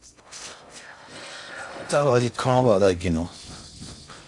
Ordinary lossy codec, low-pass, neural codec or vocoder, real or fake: AAC, 64 kbps; 10.8 kHz; codec, 16 kHz in and 24 kHz out, 0.6 kbps, FocalCodec, streaming, 4096 codes; fake